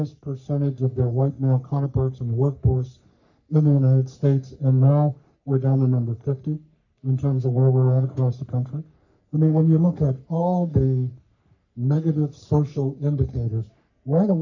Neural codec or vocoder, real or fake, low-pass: codec, 44.1 kHz, 3.4 kbps, Pupu-Codec; fake; 7.2 kHz